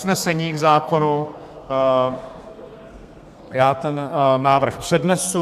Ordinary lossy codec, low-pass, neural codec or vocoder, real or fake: AAC, 96 kbps; 14.4 kHz; codec, 32 kHz, 1.9 kbps, SNAC; fake